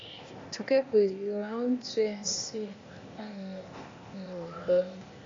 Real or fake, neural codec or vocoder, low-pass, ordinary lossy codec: fake; codec, 16 kHz, 0.8 kbps, ZipCodec; 7.2 kHz; AAC, 32 kbps